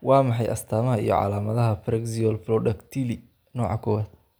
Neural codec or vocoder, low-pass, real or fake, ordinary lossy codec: none; none; real; none